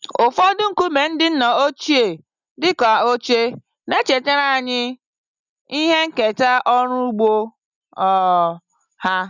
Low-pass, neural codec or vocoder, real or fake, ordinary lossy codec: 7.2 kHz; none; real; none